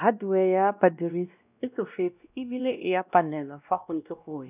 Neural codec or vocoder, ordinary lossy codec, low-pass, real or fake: codec, 16 kHz, 1 kbps, X-Codec, WavLM features, trained on Multilingual LibriSpeech; none; 3.6 kHz; fake